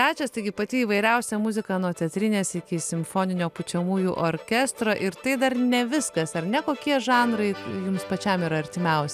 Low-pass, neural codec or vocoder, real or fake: 14.4 kHz; none; real